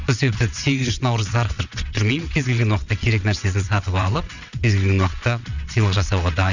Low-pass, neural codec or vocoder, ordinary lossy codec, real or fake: 7.2 kHz; vocoder, 22.05 kHz, 80 mel bands, WaveNeXt; none; fake